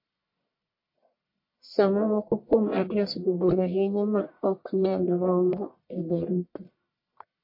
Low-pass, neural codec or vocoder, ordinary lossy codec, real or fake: 5.4 kHz; codec, 44.1 kHz, 1.7 kbps, Pupu-Codec; MP3, 32 kbps; fake